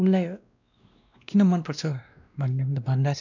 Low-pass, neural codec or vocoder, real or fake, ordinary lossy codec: 7.2 kHz; codec, 16 kHz, 1 kbps, X-Codec, WavLM features, trained on Multilingual LibriSpeech; fake; none